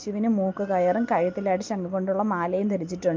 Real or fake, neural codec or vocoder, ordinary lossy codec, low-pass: real; none; Opus, 32 kbps; 7.2 kHz